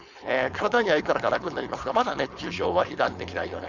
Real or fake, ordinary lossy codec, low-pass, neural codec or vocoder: fake; none; 7.2 kHz; codec, 16 kHz, 4.8 kbps, FACodec